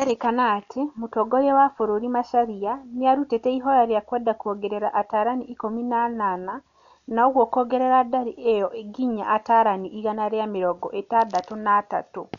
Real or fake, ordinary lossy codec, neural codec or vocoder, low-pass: real; none; none; 7.2 kHz